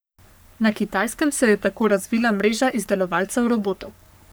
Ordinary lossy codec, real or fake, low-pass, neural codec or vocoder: none; fake; none; codec, 44.1 kHz, 3.4 kbps, Pupu-Codec